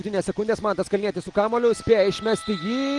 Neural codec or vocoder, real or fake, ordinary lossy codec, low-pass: none; real; Opus, 24 kbps; 10.8 kHz